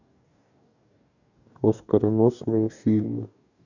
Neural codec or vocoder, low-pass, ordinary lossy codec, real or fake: codec, 44.1 kHz, 2.6 kbps, DAC; 7.2 kHz; none; fake